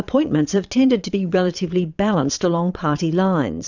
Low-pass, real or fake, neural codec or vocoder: 7.2 kHz; fake; vocoder, 22.05 kHz, 80 mel bands, Vocos